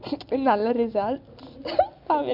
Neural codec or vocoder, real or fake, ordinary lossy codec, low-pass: none; real; MP3, 48 kbps; 5.4 kHz